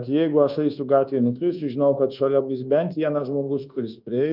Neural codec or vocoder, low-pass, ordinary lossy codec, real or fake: codec, 24 kHz, 1.2 kbps, DualCodec; 5.4 kHz; Opus, 32 kbps; fake